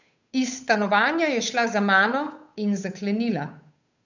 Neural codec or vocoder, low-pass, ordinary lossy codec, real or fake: codec, 16 kHz, 8 kbps, FunCodec, trained on Chinese and English, 25 frames a second; 7.2 kHz; none; fake